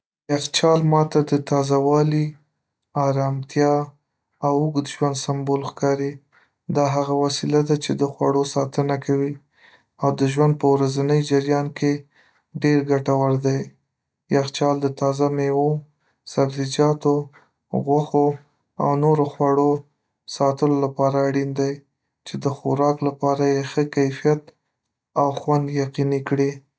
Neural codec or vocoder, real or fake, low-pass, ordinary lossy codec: none; real; none; none